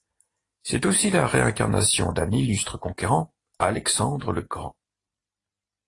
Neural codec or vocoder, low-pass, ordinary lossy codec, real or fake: none; 10.8 kHz; AAC, 32 kbps; real